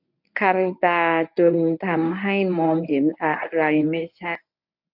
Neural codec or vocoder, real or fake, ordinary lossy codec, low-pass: codec, 24 kHz, 0.9 kbps, WavTokenizer, medium speech release version 1; fake; none; 5.4 kHz